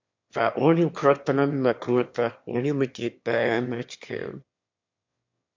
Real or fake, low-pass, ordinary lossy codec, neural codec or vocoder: fake; 7.2 kHz; MP3, 48 kbps; autoencoder, 22.05 kHz, a latent of 192 numbers a frame, VITS, trained on one speaker